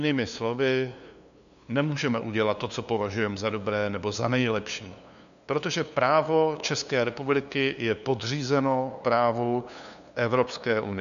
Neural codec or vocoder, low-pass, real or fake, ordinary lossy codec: codec, 16 kHz, 2 kbps, FunCodec, trained on LibriTTS, 25 frames a second; 7.2 kHz; fake; MP3, 96 kbps